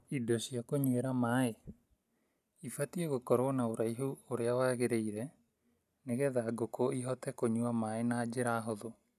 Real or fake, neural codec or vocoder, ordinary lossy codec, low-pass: real; none; none; 14.4 kHz